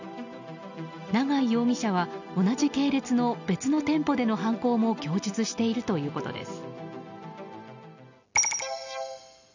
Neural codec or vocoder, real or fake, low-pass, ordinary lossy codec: none; real; 7.2 kHz; none